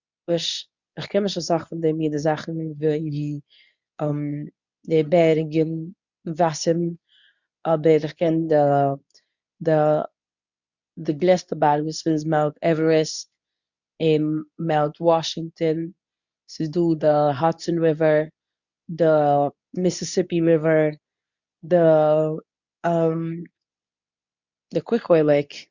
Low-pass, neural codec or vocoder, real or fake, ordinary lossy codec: 7.2 kHz; codec, 24 kHz, 0.9 kbps, WavTokenizer, medium speech release version 2; fake; none